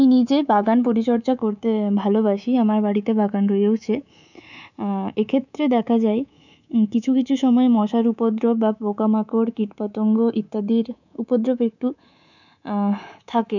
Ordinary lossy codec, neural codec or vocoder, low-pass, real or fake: none; codec, 24 kHz, 3.1 kbps, DualCodec; 7.2 kHz; fake